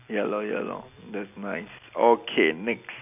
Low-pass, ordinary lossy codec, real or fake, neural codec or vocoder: 3.6 kHz; none; real; none